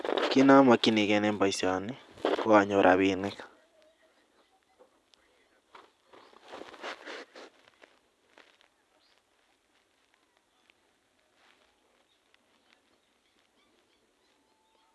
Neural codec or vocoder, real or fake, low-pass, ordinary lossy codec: none; real; none; none